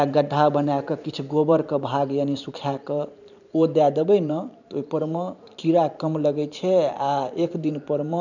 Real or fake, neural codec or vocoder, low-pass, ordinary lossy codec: fake; vocoder, 44.1 kHz, 128 mel bands every 512 samples, BigVGAN v2; 7.2 kHz; none